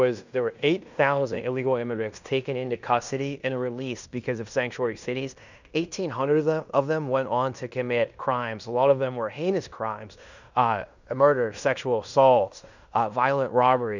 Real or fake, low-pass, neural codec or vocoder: fake; 7.2 kHz; codec, 16 kHz in and 24 kHz out, 0.9 kbps, LongCat-Audio-Codec, fine tuned four codebook decoder